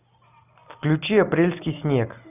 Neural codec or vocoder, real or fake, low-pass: none; real; 3.6 kHz